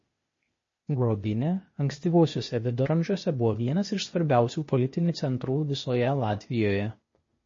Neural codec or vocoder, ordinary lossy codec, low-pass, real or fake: codec, 16 kHz, 0.8 kbps, ZipCodec; MP3, 32 kbps; 7.2 kHz; fake